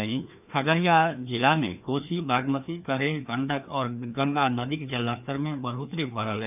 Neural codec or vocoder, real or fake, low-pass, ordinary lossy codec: codec, 16 kHz, 2 kbps, FreqCodec, larger model; fake; 3.6 kHz; none